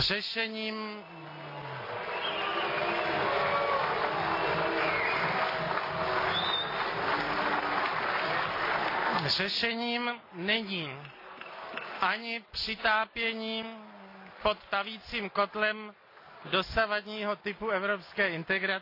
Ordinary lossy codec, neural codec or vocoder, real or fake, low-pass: AAC, 32 kbps; none; real; 5.4 kHz